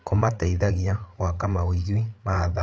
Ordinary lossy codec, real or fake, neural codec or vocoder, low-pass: none; fake; codec, 16 kHz, 8 kbps, FreqCodec, larger model; none